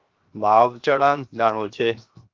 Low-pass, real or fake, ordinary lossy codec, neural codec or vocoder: 7.2 kHz; fake; Opus, 32 kbps; codec, 16 kHz, 0.7 kbps, FocalCodec